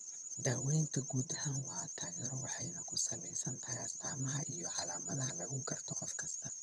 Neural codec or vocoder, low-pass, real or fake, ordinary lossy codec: vocoder, 22.05 kHz, 80 mel bands, HiFi-GAN; none; fake; none